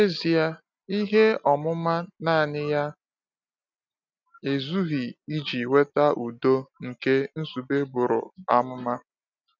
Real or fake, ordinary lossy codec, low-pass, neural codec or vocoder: real; none; 7.2 kHz; none